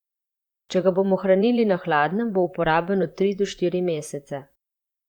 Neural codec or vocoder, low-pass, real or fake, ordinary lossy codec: vocoder, 44.1 kHz, 128 mel bands, Pupu-Vocoder; 19.8 kHz; fake; Opus, 64 kbps